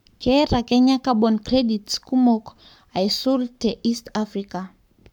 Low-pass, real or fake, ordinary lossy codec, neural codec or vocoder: 19.8 kHz; fake; none; codec, 44.1 kHz, 7.8 kbps, Pupu-Codec